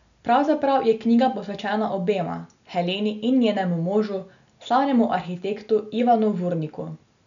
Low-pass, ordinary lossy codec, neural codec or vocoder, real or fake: 7.2 kHz; none; none; real